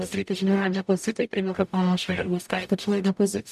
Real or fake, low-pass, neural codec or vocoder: fake; 14.4 kHz; codec, 44.1 kHz, 0.9 kbps, DAC